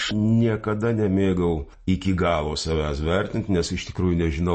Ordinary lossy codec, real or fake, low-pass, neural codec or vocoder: MP3, 32 kbps; real; 10.8 kHz; none